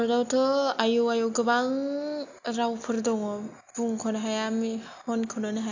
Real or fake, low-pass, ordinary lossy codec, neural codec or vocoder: real; 7.2 kHz; AAC, 48 kbps; none